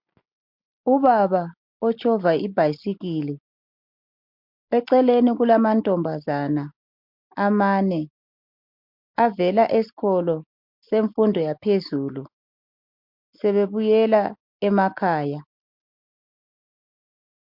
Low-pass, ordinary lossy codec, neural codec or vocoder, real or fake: 5.4 kHz; MP3, 48 kbps; none; real